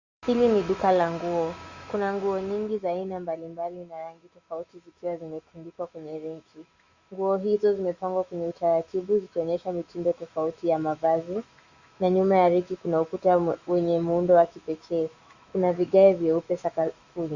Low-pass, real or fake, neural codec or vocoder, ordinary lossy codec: 7.2 kHz; fake; autoencoder, 48 kHz, 128 numbers a frame, DAC-VAE, trained on Japanese speech; Opus, 64 kbps